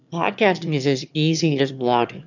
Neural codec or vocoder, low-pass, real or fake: autoencoder, 22.05 kHz, a latent of 192 numbers a frame, VITS, trained on one speaker; 7.2 kHz; fake